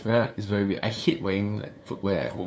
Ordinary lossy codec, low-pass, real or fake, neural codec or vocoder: none; none; fake; codec, 16 kHz, 2 kbps, FunCodec, trained on LibriTTS, 25 frames a second